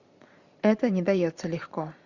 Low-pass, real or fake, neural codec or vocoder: 7.2 kHz; fake; vocoder, 44.1 kHz, 128 mel bands every 512 samples, BigVGAN v2